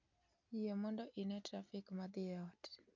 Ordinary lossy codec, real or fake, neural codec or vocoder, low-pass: none; real; none; 7.2 kHz